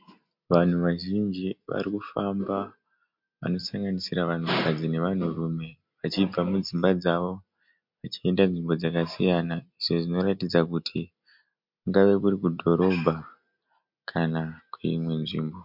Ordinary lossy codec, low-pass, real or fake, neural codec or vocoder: MP3, 48 kbps; 5.4 kHz; real; none